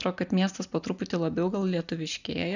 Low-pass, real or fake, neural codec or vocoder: 7.2 kHz; real; none